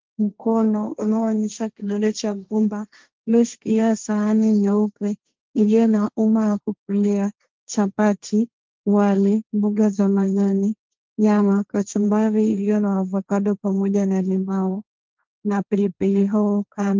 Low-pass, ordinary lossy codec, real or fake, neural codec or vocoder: 7.2 kHz; Opus, 32 kbps; fake; codec, 16 kHz, 1.1 kbps, Voila-Tokenizer